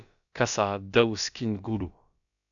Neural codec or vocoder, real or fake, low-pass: codec, 16 kHz, about 1 kbps, DyCAST, with the encoder's durations; fake; 7.2 kHz